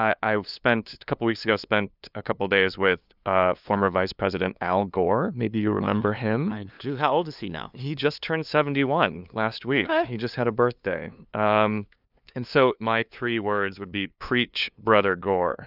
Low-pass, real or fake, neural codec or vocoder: 5.4 kHz; fake; codec, 16 kHz, 2 kbps, FunCodec, trained on LibriTTS, 25 frames a second